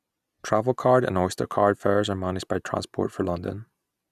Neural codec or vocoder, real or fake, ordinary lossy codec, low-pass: none; real; none; 14.4 kHz